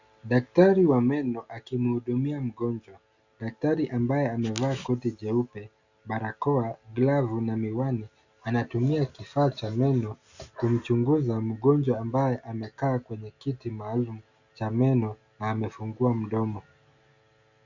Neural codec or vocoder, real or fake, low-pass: none; real; 7.2 kHz